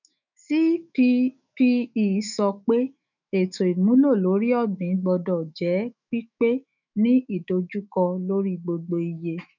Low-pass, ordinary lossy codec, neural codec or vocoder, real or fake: 7.2 kHz; none; autoencoder, 48 kHz, 128 numbers a frame, DAC-VAE, trained on Japanese speech; fake